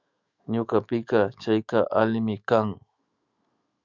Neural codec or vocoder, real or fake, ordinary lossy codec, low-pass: autoencoder, 48 kHz, 128 numbers a frame, DAC-VAE, trained on Japanese speech; fake; Opus, 64 kbps; 7.2 kHz